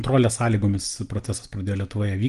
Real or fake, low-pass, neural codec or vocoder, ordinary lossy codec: real; 10.8 kHz; none; Opus, 24 kbps